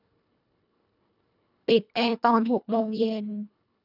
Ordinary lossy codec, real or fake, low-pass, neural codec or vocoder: none; fake; 5.4 kHz; codec, 24 kHz, 1.5 kbps, HILCodec